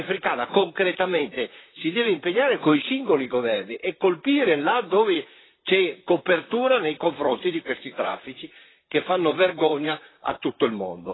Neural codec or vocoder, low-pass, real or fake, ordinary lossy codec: vocoder, 44.1 kHz, 128 mel bands, Pupu-Vocoder; 7.2 kHz; fake; AAC, 16 kbps